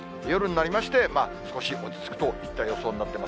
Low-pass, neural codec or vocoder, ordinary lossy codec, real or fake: none; none; none; real